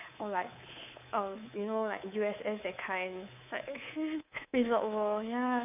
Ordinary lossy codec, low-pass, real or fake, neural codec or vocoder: none; 3.6 kHz; fake; codec, 24 kHz, 3.1 kbps, DualCodec